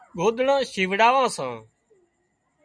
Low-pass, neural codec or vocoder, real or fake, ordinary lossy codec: 9.9 kHz; none; real; MP3, 96 kbps